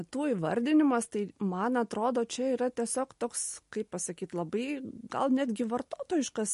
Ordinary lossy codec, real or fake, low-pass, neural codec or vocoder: MP3, 48 kbps; real; 14.4 kHz; none